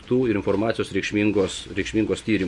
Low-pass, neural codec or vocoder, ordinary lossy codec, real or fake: 10.8 kHz; none; MP3, 48 kbps; real